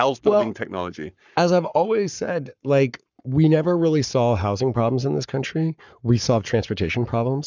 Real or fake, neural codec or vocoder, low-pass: fake; codec, 44.1 kHz, 7.8 kbps, Pupu-Codec; 7.2 kHz